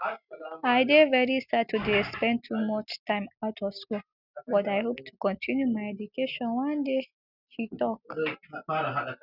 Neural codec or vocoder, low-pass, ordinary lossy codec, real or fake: none; 5.4 kHz; none; real